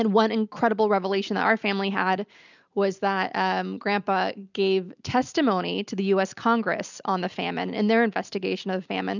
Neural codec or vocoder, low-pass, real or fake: none; 7.2 kHz; real